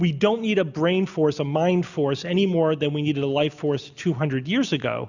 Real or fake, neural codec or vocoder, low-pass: real; none; 7.2 kHz